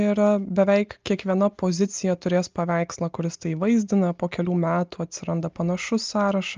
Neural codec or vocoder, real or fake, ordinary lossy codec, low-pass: none; real; Opus, 24 kbps; 7.2 kHz